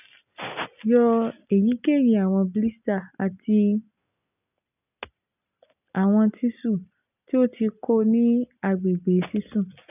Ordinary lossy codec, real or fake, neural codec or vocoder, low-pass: none; real; none; 3.6 kHz